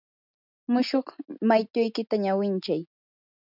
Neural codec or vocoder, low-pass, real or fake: none; 5.4 kHz; real